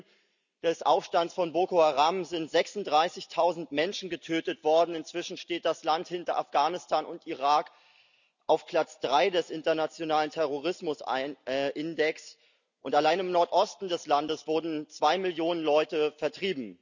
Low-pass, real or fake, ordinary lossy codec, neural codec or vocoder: 7.2 kHz; real; none; none